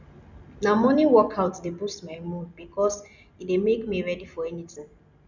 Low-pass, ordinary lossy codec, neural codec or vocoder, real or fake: 7.2 kHz; none; none; real